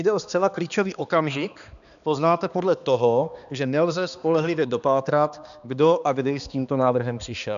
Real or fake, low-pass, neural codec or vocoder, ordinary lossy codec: fake; 7.2 kHz; codec, 16 kHz, 2 kbps, X-Codec, HuBERT features, trained on balanced general audio; MP3, 96 kbps